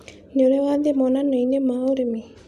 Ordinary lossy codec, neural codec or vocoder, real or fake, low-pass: none; none; real; 14.4 kHz